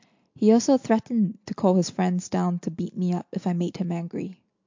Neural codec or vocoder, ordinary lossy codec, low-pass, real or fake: none; MP3, 48 kbps; 7.2 kHz; real